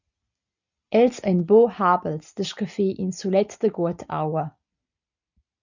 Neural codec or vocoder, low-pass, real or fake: none; 7.2 kHz; real